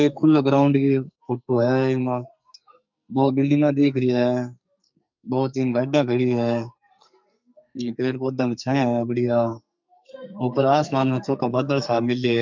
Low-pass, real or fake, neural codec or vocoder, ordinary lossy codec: 7.2 kHz; fake; codec, 44.1 kHz, 2.6 kbps, SNAC; MP3, 64 kbps